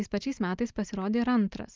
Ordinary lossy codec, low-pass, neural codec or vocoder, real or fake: Opus, 32 kbps; 7.2 kHz; none; real